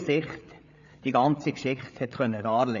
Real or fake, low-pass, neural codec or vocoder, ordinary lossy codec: fake; 7.2 kHz; codec, 16 kHz, 8 kbps, FreqCodec, larger model; AAC, 64 kbps